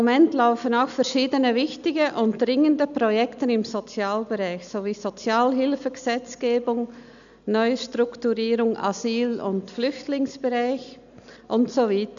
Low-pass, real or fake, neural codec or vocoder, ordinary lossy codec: 7.2 kHz; real; none; none